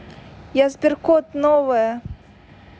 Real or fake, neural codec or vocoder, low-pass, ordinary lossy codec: real; none; none; none